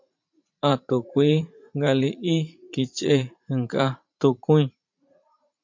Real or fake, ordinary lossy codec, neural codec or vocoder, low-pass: fake; MP3, 48 kbps; vocoder, 44.1 kHz, 80 mel bands, Vocos; 7.2 kHz